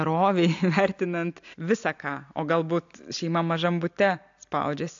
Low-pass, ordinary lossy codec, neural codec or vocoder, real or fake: 7.2 kHz; AAC, 64 kbps; none; real